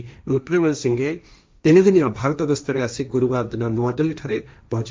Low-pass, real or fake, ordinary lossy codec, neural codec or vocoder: none; fake; none; codec, 16 kHz, 1.1 kbps, Voila-Tokenizer